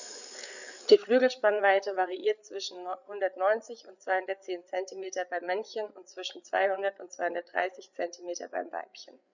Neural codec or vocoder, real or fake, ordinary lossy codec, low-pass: codec, 16 kHz, 8 kbps, FreqCodec, larger model; fake; none; 7.2 kHz